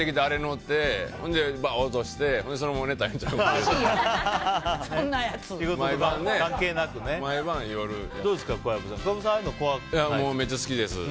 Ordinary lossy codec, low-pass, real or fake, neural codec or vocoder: none; none; real; none